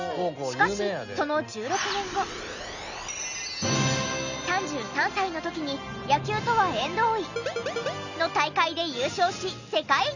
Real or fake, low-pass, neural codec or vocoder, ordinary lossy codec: real; 7.2 kHz; none; none